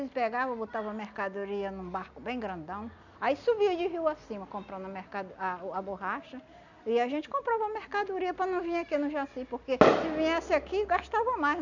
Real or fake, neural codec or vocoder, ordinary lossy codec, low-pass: real; none; none; 7.2 kHz